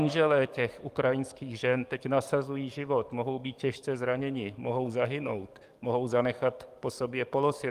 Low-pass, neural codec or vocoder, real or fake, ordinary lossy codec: 14.4 kHz; codec, 44.1 kHz, 7.8 kbps, DAC; fake; Opus, 32 kbps